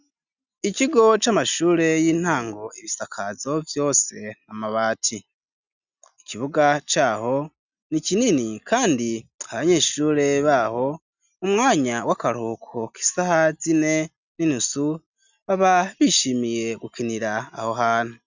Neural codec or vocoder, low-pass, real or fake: none; 7.2 kHz; real